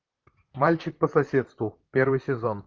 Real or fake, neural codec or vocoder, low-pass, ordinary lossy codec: fake; vocoder, 44.1 kHz, 128 mel bands, Pupu-Vocoder; 7.2 kHz; Opus, 32 kbps